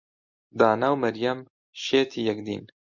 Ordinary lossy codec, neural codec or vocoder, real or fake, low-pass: MP3, 48 kbps; none; real; 7.2 kHz